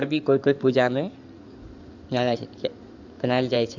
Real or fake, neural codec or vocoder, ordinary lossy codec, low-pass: fake; codec, 16 kHz in and 24 kHz out, 2.2 kbps, FireRedTTS-2 codec; none; 7.2 kHz